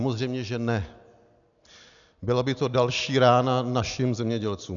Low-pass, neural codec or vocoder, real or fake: 7.2 kHz; none; real